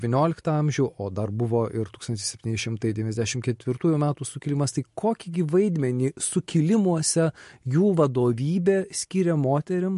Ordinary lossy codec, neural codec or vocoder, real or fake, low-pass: MP3, 48 kbps; none; real; 14.4 kHz